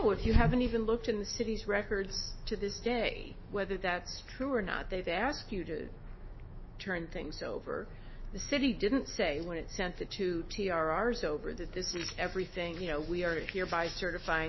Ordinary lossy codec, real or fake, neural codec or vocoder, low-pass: MP3, 24 kbps; fake; autoencoder, 48 kHz, 128 numbers a frame, DAC-VAE, trained on Japanese speech; 7.2 kHz